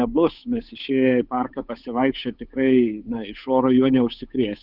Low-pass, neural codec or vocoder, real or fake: 5.4 kHz; codec, 16 kHz, 8 kbps, FunCodec, trained on Chinese and English, 25 frames a second; fake